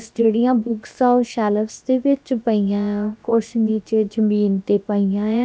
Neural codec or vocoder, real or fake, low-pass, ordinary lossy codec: codec, 16 kHz, about 1 kbps, DyCAST, with the encoder's durations; fake; none; none